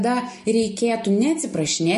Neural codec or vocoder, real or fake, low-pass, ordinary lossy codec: none; real; 14.4 kHz; MP3, 48 kbps